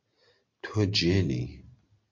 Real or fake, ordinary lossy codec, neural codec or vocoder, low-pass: real; MP3, 48 kbps; none; 7.2 kHz